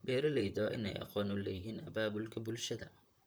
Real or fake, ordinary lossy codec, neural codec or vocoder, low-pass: fake; none; vocoder, 44.1 kHz, 128 mel bands, Pupu-Vocoder; none